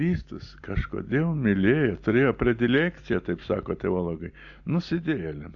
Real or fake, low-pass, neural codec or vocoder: real; 7.2 kHz; none